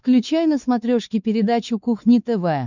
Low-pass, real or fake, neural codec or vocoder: 7.2 kHz; fake; codec, 16 kHz, 4 kbps, X-Codec, WavLM features, trained on Multilingual LibriSpeech